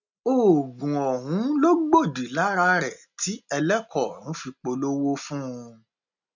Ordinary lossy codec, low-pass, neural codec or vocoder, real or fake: none; 7.2 kHz; none; real